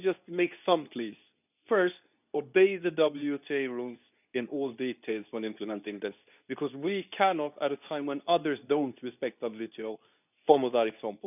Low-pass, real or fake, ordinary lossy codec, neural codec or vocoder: 3.6 kHz; fake; none; codec, 24 kHz, 0.9 kbps, WavTokenizer, medium speech release version 2